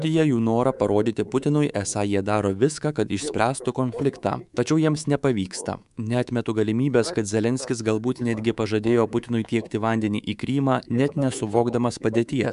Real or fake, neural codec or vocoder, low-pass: fake; codec, 24 kHz, 3.1 kbps, DualCodec; 10.8 kHz